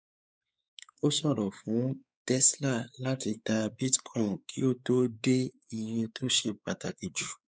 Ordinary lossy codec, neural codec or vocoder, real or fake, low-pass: none; codec, 16 kHz, 4 kbps, X-Codec, WavLM features, trained on Multilingual LibriSpeech; fake; none